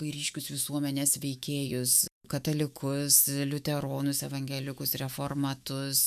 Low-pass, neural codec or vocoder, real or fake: 14.4 kHz; autoencoder, 48 kHz, 128 numbers a frame, DAC-VAE, trained on Japanese speech; fake